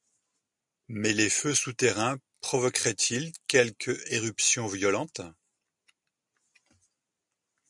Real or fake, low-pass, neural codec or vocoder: real; 10.8 kHz; none